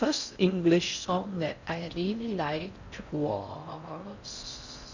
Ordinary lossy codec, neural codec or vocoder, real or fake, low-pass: none; codec, 16 kHz in and 24 kHz out, 0.6 kbps, FocalCodec, streaming, 4096 codes; fake; 7.2 kHz